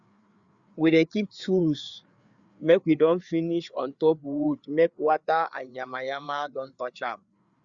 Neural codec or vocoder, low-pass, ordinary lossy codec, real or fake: codec, 16 kHz, 4 kbps, FreqCodec, larger model; 7.2 kHz; Opus, 64 kbps; fake